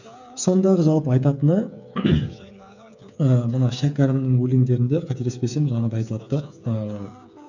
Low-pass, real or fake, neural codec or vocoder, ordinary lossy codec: 7.2 kHz; fake; codec, 16 kHz, 8 kbps, FreqCodec, smaller model; none